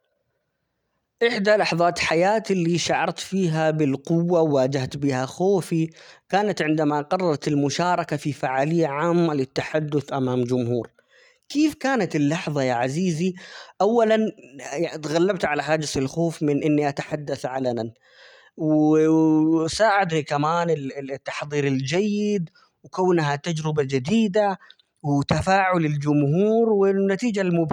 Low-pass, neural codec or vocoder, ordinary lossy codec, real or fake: 19.8 kHz; none; none; real